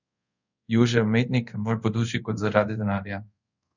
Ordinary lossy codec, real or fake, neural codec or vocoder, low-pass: none; fake; codec, 24 kHz, 0.5 kbps, DualCodec; 7.2 kHz